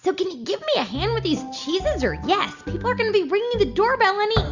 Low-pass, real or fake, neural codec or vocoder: 7.2 kHz; real; none